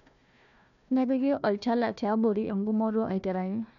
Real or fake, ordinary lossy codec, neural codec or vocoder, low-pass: fake; none; codec, 16 kHz, 1 kbps, FunCodec, trained on Chinese and English, 50 frames a second; 7.2 kHz